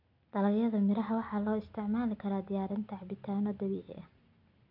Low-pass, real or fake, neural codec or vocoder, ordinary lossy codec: 5.4 kHz; real; none; none